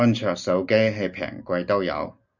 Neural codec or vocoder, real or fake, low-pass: none; real; 7.2 kHz